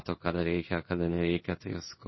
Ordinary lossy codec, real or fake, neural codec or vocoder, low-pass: MP3, 24 kbps; fake; codec, 16 kHz in and 24 kHz out, 1 kbps, XY-Tokenizer; 7.2 kHz